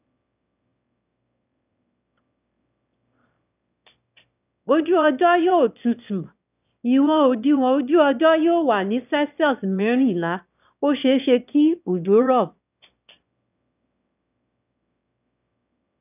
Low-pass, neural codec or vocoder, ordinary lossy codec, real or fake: 3.6 kHz; autoencoder, 22.05 kHz, a latent of 192 numbers a frame, VITS, trained on one speaker; none; fake